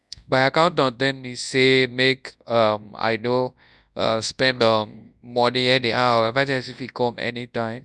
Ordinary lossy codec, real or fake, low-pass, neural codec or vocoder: none; fake; none; codec, 24 kHz, 0.9 kbps, WavTokenizer, large speech release